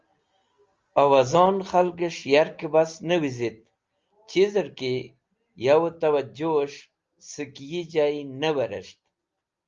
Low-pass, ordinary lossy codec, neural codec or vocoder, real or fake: 7.2 kHz; Opus, 32 kbps; none; real